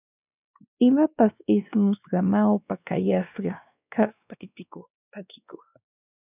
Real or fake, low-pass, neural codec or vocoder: fake; 3.6 kHz; codec, 16 kHz, 2 kbps, X-Codec, WavLM features, trained on Multilingual LibriSpeech